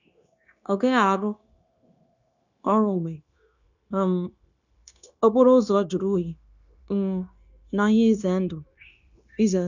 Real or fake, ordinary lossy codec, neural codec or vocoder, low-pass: fake; none; codec, 16 kHz, 0.9 kbps, LongCat-Audio-Codec; 7.2 kHz